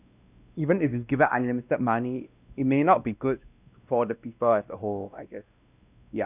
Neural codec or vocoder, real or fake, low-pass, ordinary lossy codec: codec, 16 kHz, 1 kbps, X-Codec, WavLM features, trained on Multilingual LibriSpeech; fake; 3.6 kHz; none